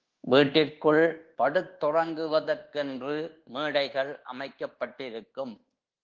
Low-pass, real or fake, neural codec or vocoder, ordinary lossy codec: 7.2 kHz; fake; codec, 24 kHz, 1.2 kbps, DualCodec; Opus, 16 kbps